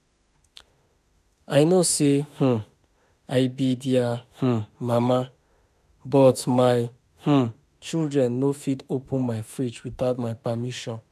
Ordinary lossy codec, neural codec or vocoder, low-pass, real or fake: none; autoencoder, 48 kHz, 32 numbers a frame, DAC-VAE, trained on Japanese speech; 14.4 kHz; fake